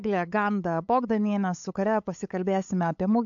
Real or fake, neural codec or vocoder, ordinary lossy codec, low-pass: fake; codec, 16 kHz, 8 kbps, FunCodec, trained on LibriTTS, 25 frames a second; AAC, 64 kbps; 7.2 kHz